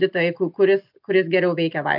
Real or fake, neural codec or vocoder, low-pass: real; none; 5.4 kHz